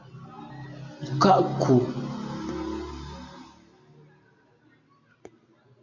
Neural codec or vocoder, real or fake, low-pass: none; real; 7.2 kHz